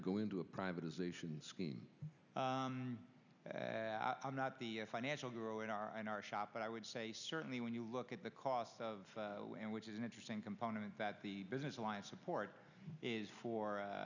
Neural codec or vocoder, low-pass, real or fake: none; 7.2 kHz; real